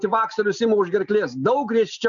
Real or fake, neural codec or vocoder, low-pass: real; none; 7.2 kHz